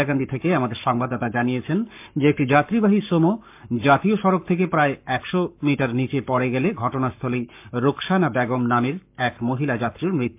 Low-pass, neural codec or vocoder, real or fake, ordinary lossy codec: 3.6 kHz; codec, 44.1 kHz, 7.8 kbps, Pupu-Codec; fake; MP3, 32 kbps